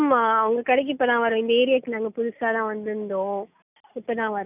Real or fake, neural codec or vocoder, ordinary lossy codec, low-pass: real; none; none; 3.6 kHz